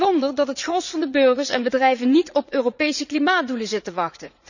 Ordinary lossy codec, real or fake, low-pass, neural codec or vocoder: none; fake; 7.2 kHz; vocoder, 44.1 kHz, 80 mel bands, Vocos